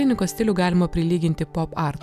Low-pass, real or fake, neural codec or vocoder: 14.4 kHz; real; none